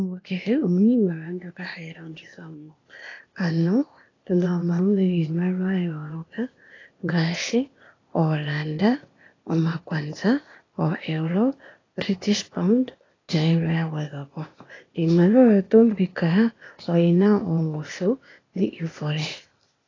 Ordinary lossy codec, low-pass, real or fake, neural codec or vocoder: AAC, 32 kbps; 7.2 kHz; fake; codec, 16 kHz, 0.8 kbps, ZipCodec